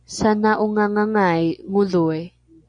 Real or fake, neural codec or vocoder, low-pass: real; none; 9.9 kHz